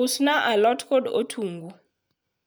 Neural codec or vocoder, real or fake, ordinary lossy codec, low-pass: none; real; none; none